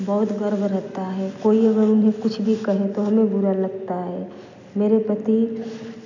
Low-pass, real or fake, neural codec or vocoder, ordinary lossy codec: 7.2 kHz; real; none; none